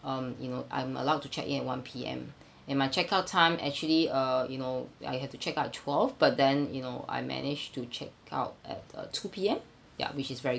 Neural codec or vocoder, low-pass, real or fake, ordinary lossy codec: none; none; real; none